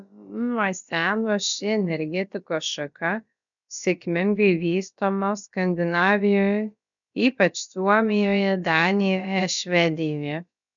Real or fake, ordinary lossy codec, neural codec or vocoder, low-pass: fake; MP3, 96 kbps; codec, 16 kHz, about 1 kbps, DyCAST, with the encoder's durations; 7.2 kHz